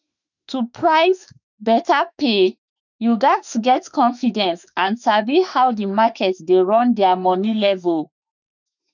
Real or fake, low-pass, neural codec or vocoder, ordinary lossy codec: fake; 7.2 kHz; autoencoder, 48 kHz, 32 numbers a frame, DAC-VAE, trained on Japanese speech; none